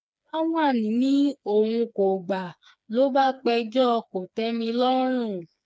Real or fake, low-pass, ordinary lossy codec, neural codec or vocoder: fake; none; none; codec, 16 kHz, 4 kbps, FreqCodec, smaller model